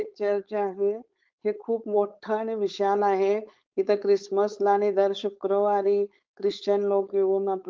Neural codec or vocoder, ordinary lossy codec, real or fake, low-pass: codec, 16 kHz, 4.8 kbps, FACodec; Opus, 32 kbps; fake; 7.2 kHz